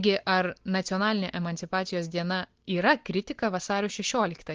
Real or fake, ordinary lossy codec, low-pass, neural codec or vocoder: real; Opus, 16 kbps; 7.2 kHz; none